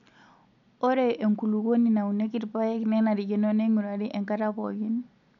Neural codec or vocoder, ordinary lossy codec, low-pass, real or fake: none; none; 7.2 kHz; real